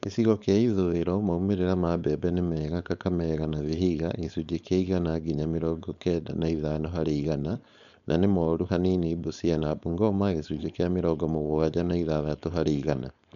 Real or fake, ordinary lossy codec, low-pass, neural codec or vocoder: fake; none; 7.2 kHz; codec, 16 kHz, 4.8 kbps, FACodec